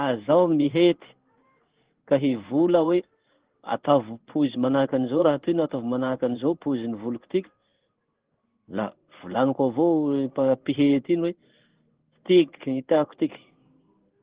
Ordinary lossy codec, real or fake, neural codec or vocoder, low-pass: Opus, 16 kbps; fake; codec, 16 kHz in and 24 kHz out, 1 kbps, XY-Tokenizer; 3.6 kHz